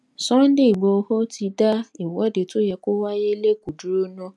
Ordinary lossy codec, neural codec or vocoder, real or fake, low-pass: none; none; real; none